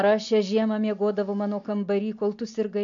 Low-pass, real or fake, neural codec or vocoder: 7.2 kHz; real; none